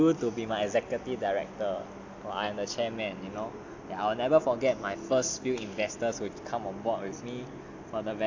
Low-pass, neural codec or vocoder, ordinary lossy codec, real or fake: 7.2 kHz; none; AAC, 48 kbps; real